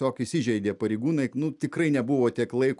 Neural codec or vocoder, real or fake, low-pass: none; real; 10.8 kHz